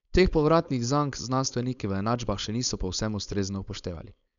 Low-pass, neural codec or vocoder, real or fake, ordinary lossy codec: 7.2 kHz; codec, 16 kHz, 4.8 kbps, FACodec; fake; none